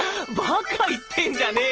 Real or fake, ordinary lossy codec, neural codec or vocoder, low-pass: real; Opus, 16 kbps; none; 7.2 kHz